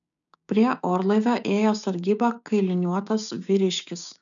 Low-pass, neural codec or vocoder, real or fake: 7.2 kHz; codec, 16 kHz, 6 kbps, DAC; fake